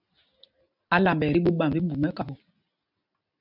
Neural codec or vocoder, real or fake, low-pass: none; real; 5.4 kHz